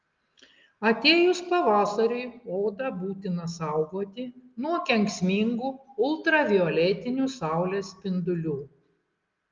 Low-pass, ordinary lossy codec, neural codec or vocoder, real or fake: 7.2 kHz; Opus, 32 kbps; none; real